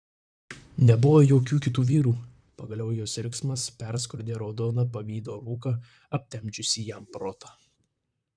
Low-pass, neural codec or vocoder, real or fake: 9.9 kHz; vocoder, 44.1 kHz, 128 mel bands, Pupu-Vocoder; fake